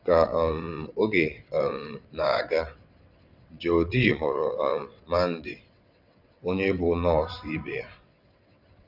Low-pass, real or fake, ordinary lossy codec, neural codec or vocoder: 5.4 kHz; fake; none; vocoder, 22.05 kHz, 80 mel bands, WaveNeXt